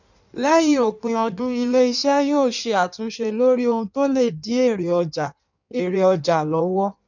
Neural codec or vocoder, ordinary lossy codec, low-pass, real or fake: codec, 16 kHz in and 24 kHz out, 1.1 kbps, FireRedTTS-2 codec; none; 7.2 kHz; fake